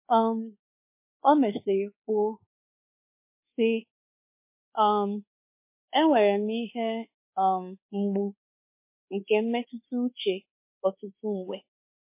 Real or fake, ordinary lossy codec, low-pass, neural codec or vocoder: fake; MP3, 24 kbps; 3.6 kHz; codec, 24 kHz, 1.2 kbps, DualCodec